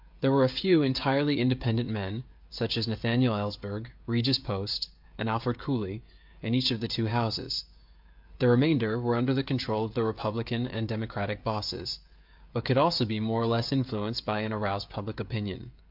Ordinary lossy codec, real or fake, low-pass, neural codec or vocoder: MP3, 48 kbps; fake; 5.4 kHz; codec, 16 kHz, 16 kbps, FreqCodec, smaller model